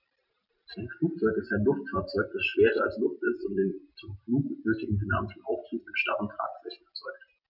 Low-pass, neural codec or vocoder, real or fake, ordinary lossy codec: 5.4 kHz; none; real; none